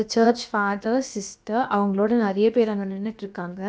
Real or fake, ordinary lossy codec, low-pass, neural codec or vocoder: fake; none; none; codec, 16 kHz, about 1 kbps, DyCAST, with the encoder's durations